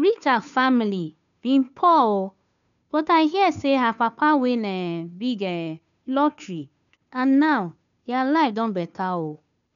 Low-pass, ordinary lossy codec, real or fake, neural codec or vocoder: 7.2 kHz; none; fake; codec, 16 kHz, 2 kbps, FunCodec, trained on Chinese and English, 25 frames a second